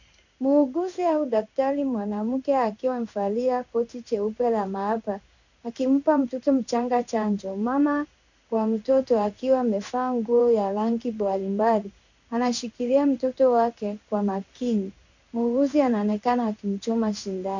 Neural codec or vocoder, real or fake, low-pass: codec, 16 kHz in and 24 kHz out, 1 kbps, XY-Tokenizer; fake; 7.2 kHz